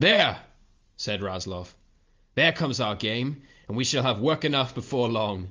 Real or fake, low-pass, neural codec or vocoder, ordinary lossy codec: real; 7.2 kHz; none; Opus, 32 kbps